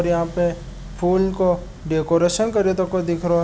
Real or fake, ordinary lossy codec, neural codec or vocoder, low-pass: real; none; none; none